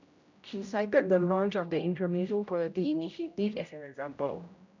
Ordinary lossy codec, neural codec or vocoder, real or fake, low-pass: none; codec, 16 kHz, 0.5 kbps, X-Codec, HuBERT features, trained on general audio; fake; 7.2 kHz